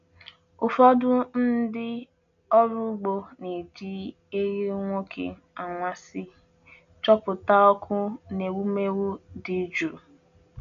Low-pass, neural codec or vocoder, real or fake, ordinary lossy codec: 7.2 kHz; none; real; none